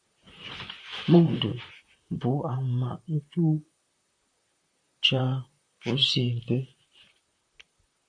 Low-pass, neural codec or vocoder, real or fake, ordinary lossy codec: 9.9 kHz; vocoder, 44.1 kHz, 128 mel bands, Pupu-Vocoder; fake; AAC, 64 kbps